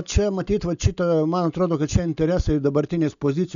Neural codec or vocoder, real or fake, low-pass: none; real; 7.2 kHz